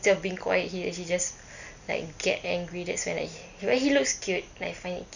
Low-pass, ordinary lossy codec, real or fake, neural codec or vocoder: 7.2 kHz; none; real; none